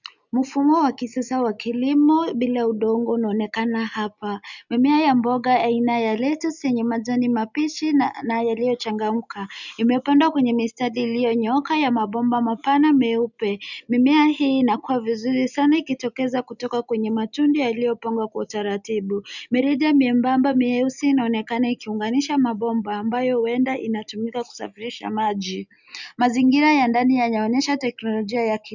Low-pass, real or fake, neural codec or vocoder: 7.2 kHz; real; none